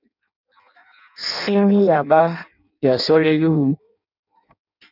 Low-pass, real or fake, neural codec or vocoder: 5.4 kHz; fake; codec, 16 kHz in and 24 kHz out, 0.6 kbps, FireRedTTS-2 codec